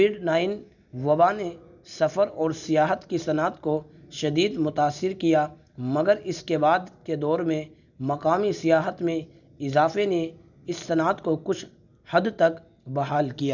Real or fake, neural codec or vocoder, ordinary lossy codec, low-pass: real; none; none; 7.2 kHz